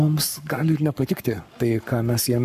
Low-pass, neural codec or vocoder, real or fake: 14.4 kHz; codec, 44.1 kHz, 7.8 kbps, Pupu-Codec; fake